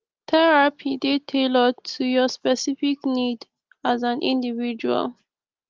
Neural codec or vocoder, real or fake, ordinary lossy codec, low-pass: none; real; Opus, 32 kbps; 7.2 kHz